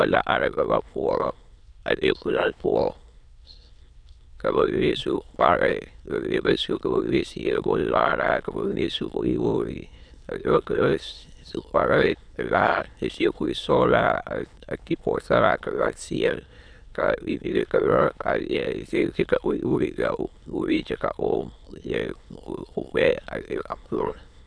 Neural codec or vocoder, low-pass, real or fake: autoencoder, 22.05 kHz, a latent of 192 numbers a frame, VITS, trained on many speakers; 9.9 kHz; fake